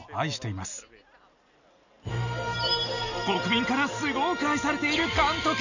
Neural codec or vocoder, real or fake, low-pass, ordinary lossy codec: none; real; 7.2 kHz; none